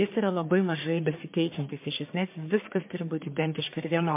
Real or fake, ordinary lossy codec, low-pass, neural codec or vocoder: fake; MP3, 32 kbps; 3.6 kHz; codec, 44.1 kHz, 1.7 kbps, Pupu-Codec